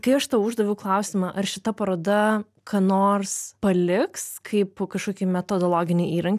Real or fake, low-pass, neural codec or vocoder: real; 14.4 kHz; none